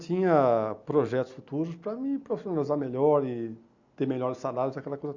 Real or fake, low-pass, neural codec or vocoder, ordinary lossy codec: real; 7.2 kHz; none; Opus, 64 kbps